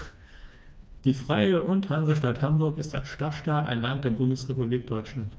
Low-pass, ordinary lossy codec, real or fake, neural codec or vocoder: none; none; fake; codec, 16 kHz, 2 kbps, FreqCodec, smaller model